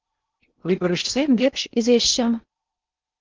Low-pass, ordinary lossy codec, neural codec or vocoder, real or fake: 7.2 kHz; Opus, 32 kbps; codec, 16 kHz in and 24 kHz out, 0.6 kbps, FocalCodec, streaming, 2048 codes; fake